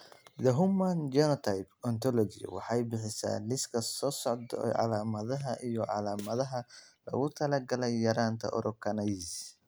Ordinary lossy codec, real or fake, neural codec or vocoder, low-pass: none; real; none; none